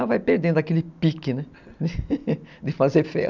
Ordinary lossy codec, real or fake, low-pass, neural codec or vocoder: none; real; 7.2 kHz; none